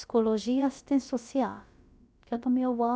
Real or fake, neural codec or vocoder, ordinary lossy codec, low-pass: fake; codec, 16 kHz, about 1 kbps, DyCAST, with the encoder's durations; none; none